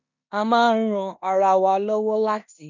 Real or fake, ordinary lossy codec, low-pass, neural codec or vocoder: fake; none; 7.2 kHz; codec, 16 kHz in and 24 kHz out, 0.9 kbps, LongCat-Audio-Codec, four codebook decoder